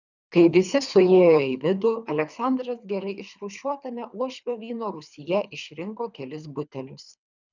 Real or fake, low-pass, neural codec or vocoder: fake; 7.2 kHz; codec, 24 kHz, 3 kbps, HILCodec